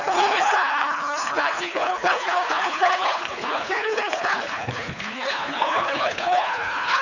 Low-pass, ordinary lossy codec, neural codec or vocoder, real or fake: 7.2 kHz; none; codec, 24 kHz, 3 kbps, HILCodec; fake